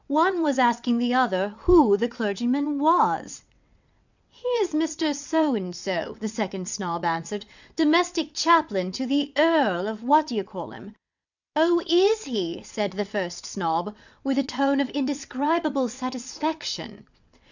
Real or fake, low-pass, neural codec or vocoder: fake; 7.2 kHz; vocoder, 22.05 kHz, 80 mel bands, WaveNeXt